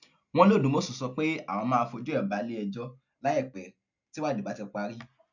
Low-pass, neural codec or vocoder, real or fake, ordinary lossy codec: 7.2 kHz; none; real; none